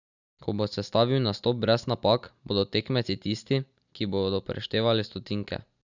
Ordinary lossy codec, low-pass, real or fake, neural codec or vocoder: none; 7.2 kHz; real; none